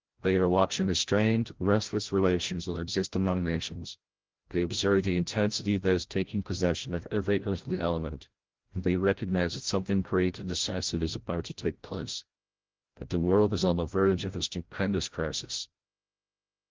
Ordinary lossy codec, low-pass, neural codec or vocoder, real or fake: Opus, 16 kbps; 7.2 kHz; codec, 16 kHz, 0.5 kbps, FreqCodec, larger model; fake